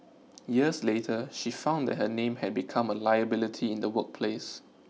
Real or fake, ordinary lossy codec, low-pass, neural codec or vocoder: real; none; none; none